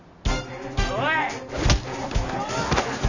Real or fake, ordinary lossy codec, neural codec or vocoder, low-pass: real; none; none; 7.2 kHz